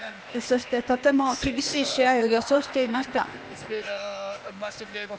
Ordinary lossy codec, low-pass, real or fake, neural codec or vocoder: none; none; fake; codec, 16 kHz, 0.8 kbps, ZipCodec